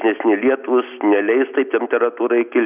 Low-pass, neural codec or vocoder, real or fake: 3.6 kHz; none; real